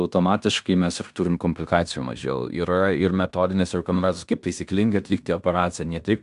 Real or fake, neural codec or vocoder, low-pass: fake; codec, 16 kHz in and 24 kHz out, 0.9 kbps, LongCat-Audio-Codec, fine tuned four codebook decoder; 10.8 kHz